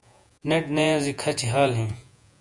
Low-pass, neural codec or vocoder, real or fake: 10.8 kHz; vocoder, 48 kHz, 128 mel bands, Vocos; fake